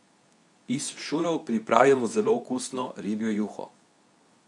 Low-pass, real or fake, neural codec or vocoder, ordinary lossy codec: 10.8 kHz; fake; codec, 24 kHz, 0.9 kbps, WavTokenizer, medium speech release version 2; none